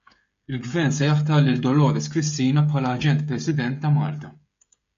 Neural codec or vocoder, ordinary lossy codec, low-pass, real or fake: codec, 16 kHz, 16 kbps, FreqCodec, smaller model; MP3, 48 kbps; 7.2 kHz; fake